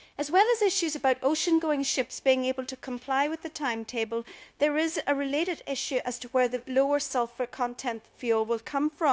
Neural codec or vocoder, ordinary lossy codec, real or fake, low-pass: codec, 16 kHz, 0.9 kbps, LongCat-Audio-Codec; none; fake; none